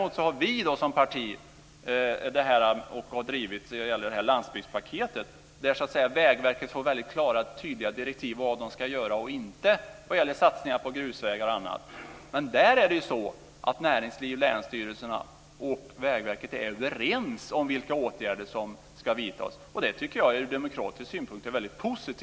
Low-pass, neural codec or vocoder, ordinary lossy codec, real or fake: none; none; none; real